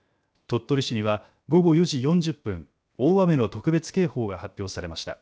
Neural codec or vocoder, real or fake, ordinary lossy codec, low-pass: codec, 16 kHz, 0.7 kbps, FocalCodec; fake; none; none